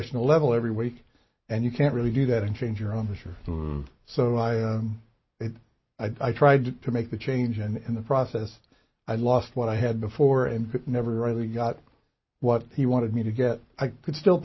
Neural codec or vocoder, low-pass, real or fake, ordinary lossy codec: none; 7.2 kHz; real; MP3, 24 kbps